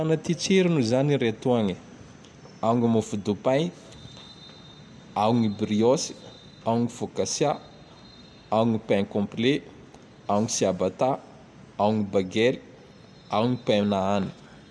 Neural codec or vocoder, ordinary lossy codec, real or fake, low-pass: none; none; real; none